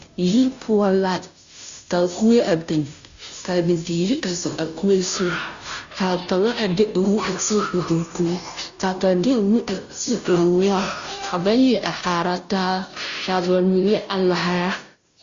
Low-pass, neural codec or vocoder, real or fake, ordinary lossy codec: 7.2 kHz; codec, 16 kHz, 0.5 kbps, FunCodec, trained on Chinese and English, 25 frames a second; fake; Opus, 64 kbps